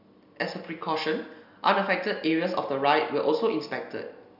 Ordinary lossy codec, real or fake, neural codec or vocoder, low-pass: none; real; none; 5.4 kHz